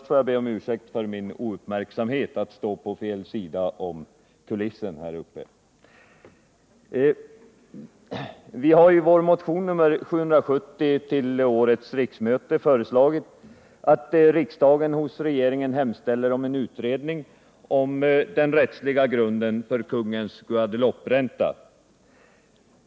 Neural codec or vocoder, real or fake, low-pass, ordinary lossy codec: none; real; none; none